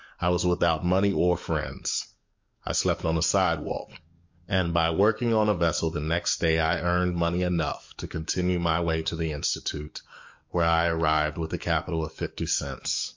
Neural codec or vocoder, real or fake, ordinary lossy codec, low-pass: codec, 44.1 kHz, 7.8 kbps, DAC; fake; MP3, 48 kbps; 7.2 kHz